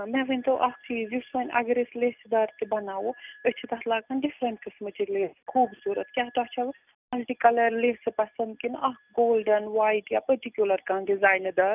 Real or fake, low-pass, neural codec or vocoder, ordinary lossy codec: real; 3.6 kHz; none; none